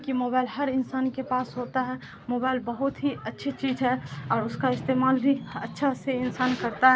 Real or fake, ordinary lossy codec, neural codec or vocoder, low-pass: real; none; none; none